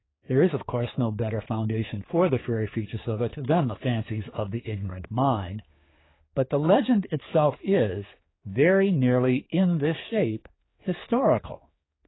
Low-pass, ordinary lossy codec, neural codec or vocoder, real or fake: 7.2 kHz; AAC, 16 kbps; codec, 16 kHz, 4 kbps, X-Codec, HuBERT features, trained on general audio; fake